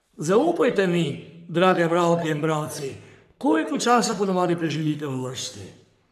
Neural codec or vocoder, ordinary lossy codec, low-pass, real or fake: codec, 44.1 kHz, 3.4 kbps, Pupu-Codec; none; 14.4 kHz; fake